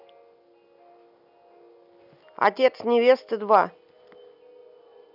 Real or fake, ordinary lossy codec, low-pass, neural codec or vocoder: real; none; 5.4 kHz; none